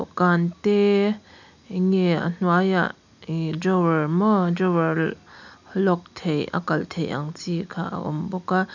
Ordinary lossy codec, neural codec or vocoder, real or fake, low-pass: none; none; real; 7.2 kHz